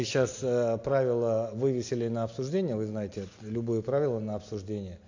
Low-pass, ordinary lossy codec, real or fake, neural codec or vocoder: 7.2 kHz; AAC, 48 kbps; real; none